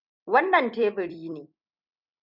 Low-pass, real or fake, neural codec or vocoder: 5.4 kHz; fake; vocoder, 44.1 kHz, 128 mel bands every 256 samples, BigVGAN v2